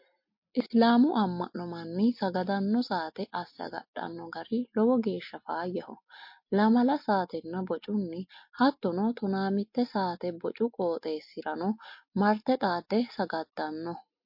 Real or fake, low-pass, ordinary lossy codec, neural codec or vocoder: real; 5.4 kHz; MP3, 32 kbps; none